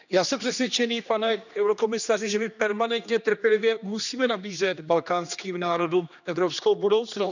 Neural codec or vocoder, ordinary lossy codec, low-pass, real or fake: codec, 16 kHz, 2 kbps, X-Codec, HuBERT features, trained on general audio; none; 7.2 kHz; fake